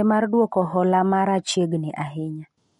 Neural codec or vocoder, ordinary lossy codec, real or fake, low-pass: none; MP3, 48 kbps; real; 10.8 kHz